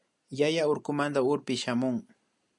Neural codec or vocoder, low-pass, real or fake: none; 10.8 kHz; real